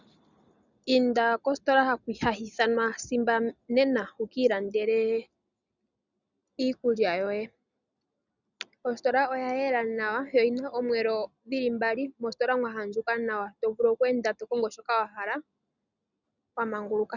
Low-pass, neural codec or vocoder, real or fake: 7.2 kHz; none; real